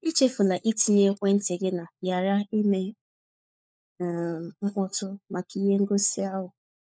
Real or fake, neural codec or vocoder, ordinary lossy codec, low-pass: fake; codec, 16 kHz, 4 kbps, FunCodec, trained on LibriTTS, 50 frames a second; none; none